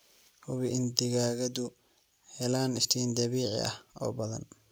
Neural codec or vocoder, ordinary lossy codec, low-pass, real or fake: none; none; none; real